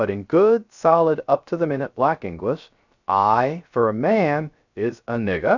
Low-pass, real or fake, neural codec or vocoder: 7.2 kHz; fake; codec, 16 kHz, 0.3 kbps, FocalCodec